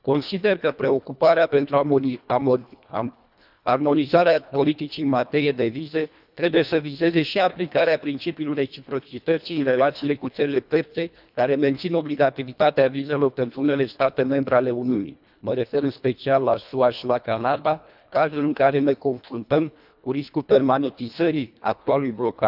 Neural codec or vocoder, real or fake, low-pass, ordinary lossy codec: codec, 24 kHz, 1.5 kbps, HILCodec; fake; 5.4 kHz; none